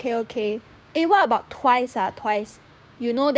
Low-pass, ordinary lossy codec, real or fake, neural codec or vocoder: none; none; fake; codec, 16 kHz, 6 kbps, DAC